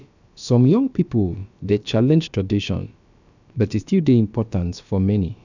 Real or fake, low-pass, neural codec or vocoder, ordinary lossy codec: fake; 7.2 kHz; codec, 16 kHz, about 1 kbps, DyCAST, with the encoder's durations; none